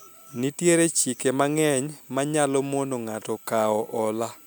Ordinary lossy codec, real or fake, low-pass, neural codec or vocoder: none; real; none; none